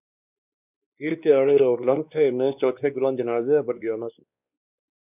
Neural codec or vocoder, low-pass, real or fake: codec, 16 kHz, 2 kbps, X-Codec, WavLM features, trained on Multilingual LibriSpeech; 3.6 kHz; fake